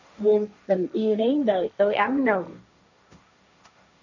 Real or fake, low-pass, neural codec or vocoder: fake; 7.2 kHz; codec, 16 kHz, 1.1 kbps, Voila-Tokenizer